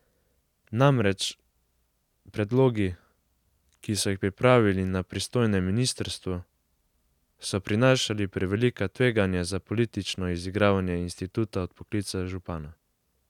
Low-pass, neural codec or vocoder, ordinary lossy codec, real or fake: 19.8 kHz; none; none; real